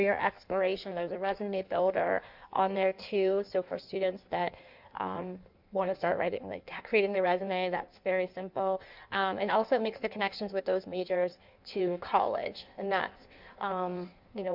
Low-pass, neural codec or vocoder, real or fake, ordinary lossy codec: 5.4 kHz; codec, 16 kHz in and 24 kHz out, 1.1 kbps, FireRedTTS-2 codec; fake; MP3, 48 kbps